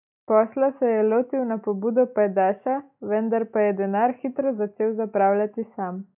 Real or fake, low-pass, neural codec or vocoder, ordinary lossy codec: real; 3.6 kHz; none; none